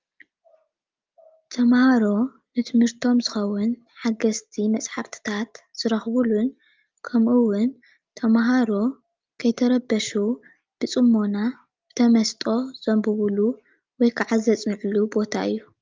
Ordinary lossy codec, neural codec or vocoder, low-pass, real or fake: Opus, 32 kbps; none; 7.2 kHz; real